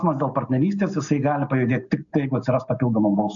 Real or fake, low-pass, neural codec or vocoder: real; 7.2 kHz; none